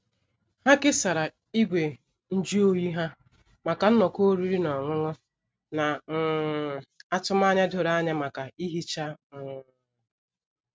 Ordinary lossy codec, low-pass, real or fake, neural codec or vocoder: none; none; real; none